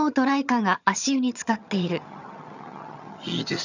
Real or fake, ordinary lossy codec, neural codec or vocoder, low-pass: fake; none; vocoder, 22.05 kHz, 80 mel bands, HiFi-GAN; 7.2 kHz